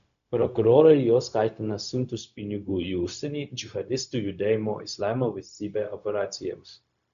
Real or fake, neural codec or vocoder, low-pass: fake; codec, 16 kHz, 0.4 kbps, LongCat-Audio-Codec; 7.2 kHz